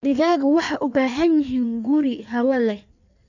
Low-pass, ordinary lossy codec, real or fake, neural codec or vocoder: 7.2 kHz; none; fake; codec, 16 kHz in and 24 kHz out, 1.1 kbps, FireRedTTS-2 codec